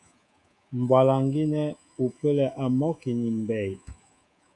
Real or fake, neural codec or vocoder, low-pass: fake; codec, 24 kHz, 3.1 kbps, DualCodec; 10.8 kHz